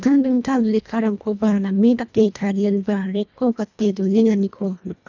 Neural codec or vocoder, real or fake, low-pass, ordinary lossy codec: codec, 24 kHz, 1.5 kbps, HILCodec; fake; 7.2 kHz; none